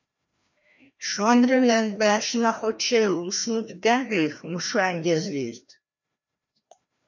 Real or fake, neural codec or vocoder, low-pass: fake; codec, 16 kHz, 1 kbps, FreqCodec, larger model; 7.2 kHz